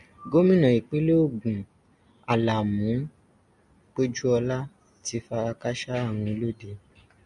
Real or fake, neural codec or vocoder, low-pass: real; none; 10.8 kHz